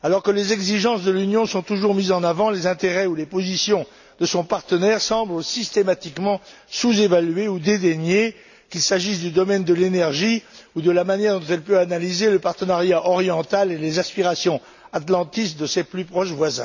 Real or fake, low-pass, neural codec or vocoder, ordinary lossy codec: real; 7.2 kHz; none; none